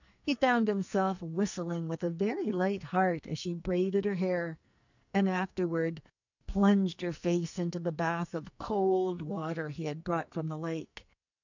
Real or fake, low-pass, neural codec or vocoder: fake; 7.2 kHz; codec, 44.1 kHz, 2.6 kbps, SNAC